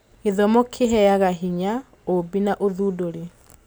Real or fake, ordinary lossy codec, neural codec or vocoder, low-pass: real; none; none; none